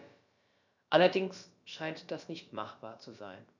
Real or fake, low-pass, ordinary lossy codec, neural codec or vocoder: fake; 7.2 kHz; none; codec, 16 kHz, about 1 kbps, DyCAST, with the encoder's durations